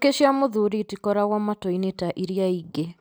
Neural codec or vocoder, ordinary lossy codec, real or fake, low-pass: none; none; real; none